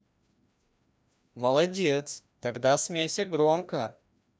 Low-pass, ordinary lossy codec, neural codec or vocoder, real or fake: none; none; codec, 16 kHz, 1 kbps, FreqCodec, larger model; fake